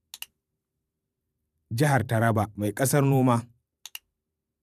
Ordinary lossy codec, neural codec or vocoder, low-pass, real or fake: none; vocoder, 44.1 kHz, 128 mel bands every 512 samples, BigVGAN v2; 14.4 kHz; fake